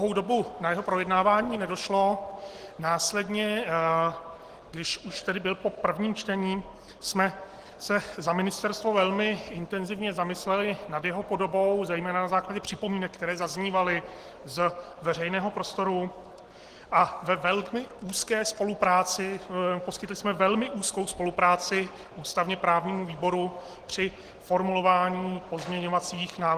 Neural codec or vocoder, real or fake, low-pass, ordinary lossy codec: none; real; 14.4 kHz; Opus, 16 kbps